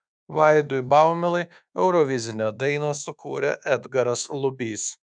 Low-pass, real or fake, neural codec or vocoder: 9.9 kHz; fake; codec, 24 kHz, 1.2 kbps, DualCodec